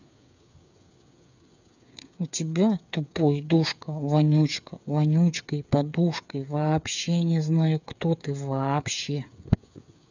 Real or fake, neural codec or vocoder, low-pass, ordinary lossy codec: fake; codec, 16 kHz, 8 kbps, FreqCodec, smaller model; 7.2 kHz; none